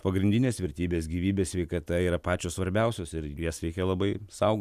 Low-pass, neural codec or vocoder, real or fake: 14.4 kHz; none; real